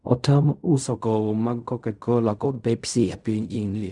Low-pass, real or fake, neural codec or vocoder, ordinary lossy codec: 10.8 kHz; fake; codec, 16 kHz in and 24 kHz out, 0.4 kbps, LongCat-Audio-Codec, fine tuned four codebook decoder; none